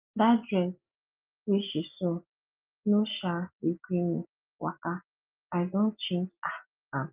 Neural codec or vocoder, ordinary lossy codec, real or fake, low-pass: none; Opus, 24 kbps; real; 3.6 kHz